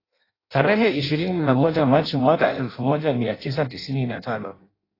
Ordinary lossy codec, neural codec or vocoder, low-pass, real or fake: AAC, 24 kbps; codec, 16 kHz in and 24 kHz out, 0.6 kbps, FireRedTTS-2 codec; 5.4 kHz; fake